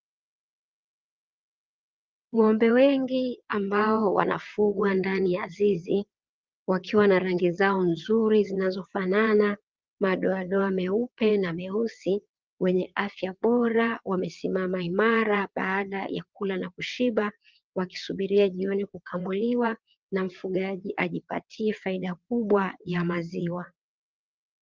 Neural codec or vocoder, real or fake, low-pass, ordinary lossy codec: vocoder, 22.05 kHz, 80 mel bands, WaveNeXt; fake; 7.2 kHz; Opus, 32 kbps